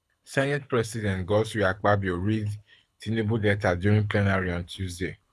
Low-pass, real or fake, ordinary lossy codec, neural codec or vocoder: none; fake; none; codec, 24 kHz, 6 kbps, HILCodec